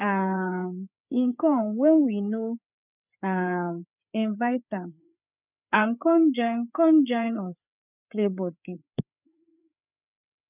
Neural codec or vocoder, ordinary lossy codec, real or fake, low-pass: codec, 16 kHz, 4 kbps, FreqCodec, larger model; none; fake; 3.6 kHz